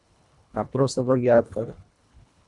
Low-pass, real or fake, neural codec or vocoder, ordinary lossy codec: 10.8 kHz; fake; codec, 24 kHz, 1.5 kbps, HILCodec; MP3, 96 kbps